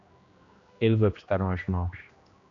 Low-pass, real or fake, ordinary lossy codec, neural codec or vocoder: 7.2 kHz; fake; MP3, 96 kbps; codec, 16 kHz, 1 kbps, X-Codec, HuBERT features, trained on general audio